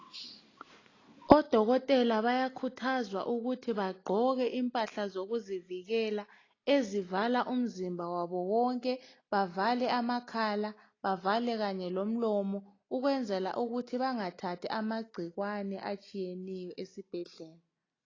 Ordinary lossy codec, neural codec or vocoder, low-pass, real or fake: AAC, 32 kbps; none; 7.2 kHz; real